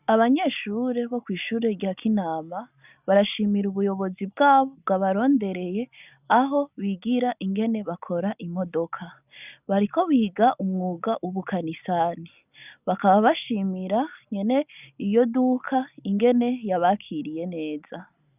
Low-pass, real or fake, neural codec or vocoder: 3.6 kHz; real; none